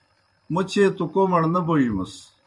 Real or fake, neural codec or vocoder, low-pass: fake; vocoder, 44.1 kHz, 128 mel bands every 256 samples, BigVGAN v2; 10.8 kHz